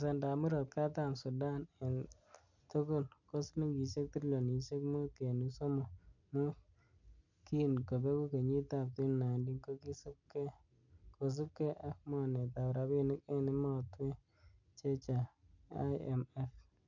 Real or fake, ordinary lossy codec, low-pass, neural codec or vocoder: real; none; 7.2 kHz; none